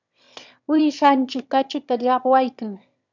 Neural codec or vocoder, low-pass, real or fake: autoencoder, 22.05 kHz, a latent of 192 numbers a frame, VITS, trained on one speaker; 7.2 kHz; fake